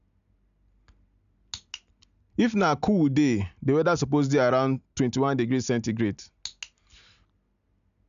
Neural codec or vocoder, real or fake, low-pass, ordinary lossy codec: none; real; 7.2 kHz; none